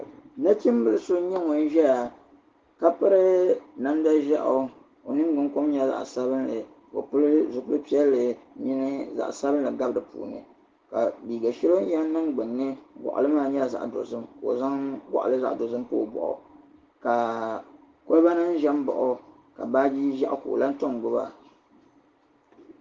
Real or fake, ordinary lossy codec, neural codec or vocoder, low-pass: real; Opus, 16 kbps; none; 7.2 kHz